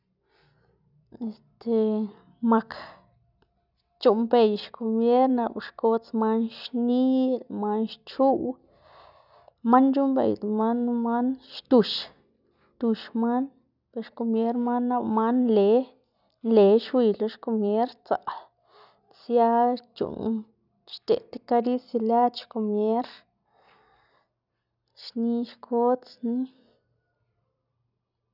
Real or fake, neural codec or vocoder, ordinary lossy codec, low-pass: real; none; none; 5.4 kHz